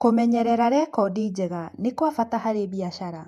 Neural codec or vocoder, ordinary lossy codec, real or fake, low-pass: vocoder, 48 kHz, 128 mel bands, Vocos; AAC, 96 kbps; fake; 14.4 kHz